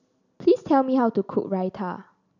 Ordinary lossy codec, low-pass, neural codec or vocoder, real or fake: none; 7.2 kHz; none; real